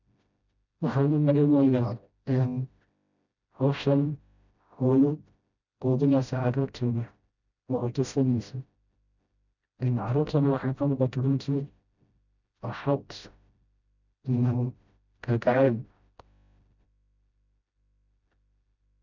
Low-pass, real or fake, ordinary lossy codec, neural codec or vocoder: 7.2 kHz; fake; none; codec, 16 kHz, 0.5 kbps, FreqCodec, smaller model